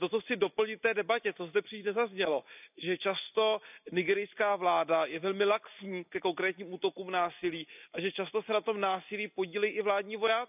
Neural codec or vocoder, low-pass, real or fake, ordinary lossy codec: none; 3.6 kHz; real; none